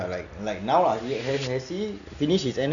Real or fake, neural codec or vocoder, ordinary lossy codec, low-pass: real; none; none; 7.2 kHz